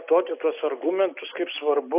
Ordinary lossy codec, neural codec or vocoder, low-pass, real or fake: AAC, 32 kbps; none; 3.6 kHz; real